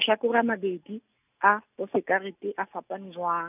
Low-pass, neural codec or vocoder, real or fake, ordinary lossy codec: 3.6 kHz; none; real; none